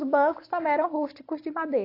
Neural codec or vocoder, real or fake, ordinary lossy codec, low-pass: none; real; AAC, 32 kbps; 5.4 kHz